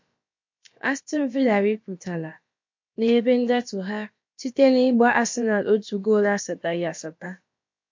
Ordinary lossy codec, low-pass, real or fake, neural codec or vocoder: MP3, 48 kbps; 7.2 kHz; fake; codec, 16 kHz, about 1 kbps, DyCAST, with the encoder's durations